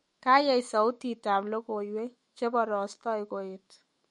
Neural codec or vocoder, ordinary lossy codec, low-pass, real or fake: autoencoder, 48 kHz, 128 numbers a frame, DAC-VAE, trained on Japanese speech; MP3, 48 kbps; 19.8 kHz; fake